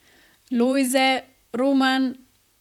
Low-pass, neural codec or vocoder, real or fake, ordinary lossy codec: 19.8 kHz; vocoder, 44.1 kHz, 128 mel bands, Pupu-Vocoder; fake; none